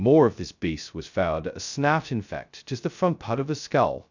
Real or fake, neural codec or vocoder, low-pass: fake; codec, 16 kHz, 0.2 kbps, FocalCodec; 7.2 kHz